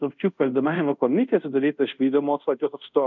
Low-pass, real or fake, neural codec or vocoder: 7.2 kHz; fake; codec, 24 kHz, 0.5 kbps, DualCodec